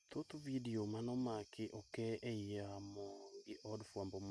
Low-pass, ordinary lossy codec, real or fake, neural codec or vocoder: none; none; real; none